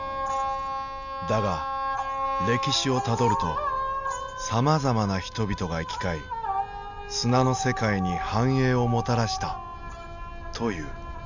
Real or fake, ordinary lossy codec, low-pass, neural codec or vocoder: real; none; 7.2 kHz; none